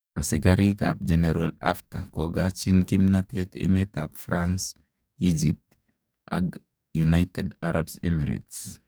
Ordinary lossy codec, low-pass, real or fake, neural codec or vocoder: none; none; fake; codec, 44.1 kHz, 2.6 kbps, DAC